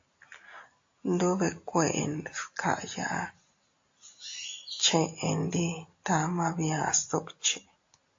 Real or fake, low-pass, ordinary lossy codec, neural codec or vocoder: real; 7.2 kHz; AAC, 48 kbps; none